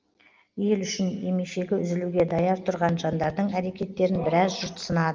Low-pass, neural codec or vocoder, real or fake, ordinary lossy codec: 7.2 kHz; none; real; Opus, 32 kbps